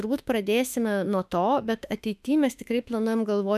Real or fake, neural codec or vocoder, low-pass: fake; autoencoder, 48 kHz, 32 numbers a frame, DAC-VAE, trained on Japanese speech; 14.4 kHz